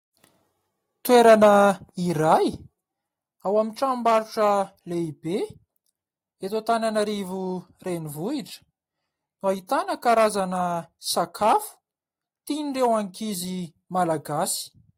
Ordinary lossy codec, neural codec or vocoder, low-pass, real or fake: AAC, 48 kbps; none; 19.8 kHz; real